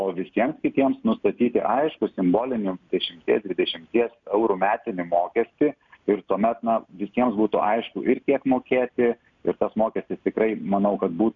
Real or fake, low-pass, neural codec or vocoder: real; 7.2 kHz; none